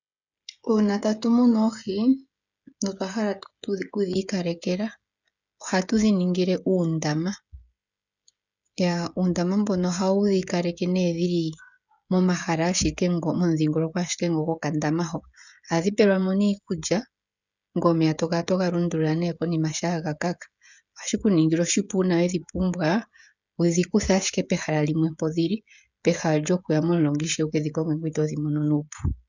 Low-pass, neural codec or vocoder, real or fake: 7.2 kHz; codec, 16 kHz, 16 kbps, FreqCodec, smaller model; fake